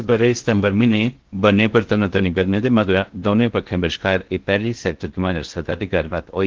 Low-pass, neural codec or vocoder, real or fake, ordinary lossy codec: 7.2 kHz; codec, 16 kHz in and 24 kHz out, 0.6 kbps, FocalCodec, streaming, 2048 codes; fake; Opus, 16 kbps